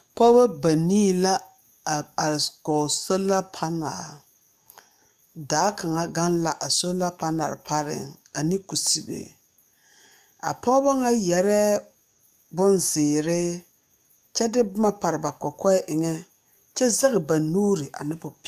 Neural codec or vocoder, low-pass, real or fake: codec, 44.1 kHz, 7.8 kbps, DAC; 14.4 kHz; fake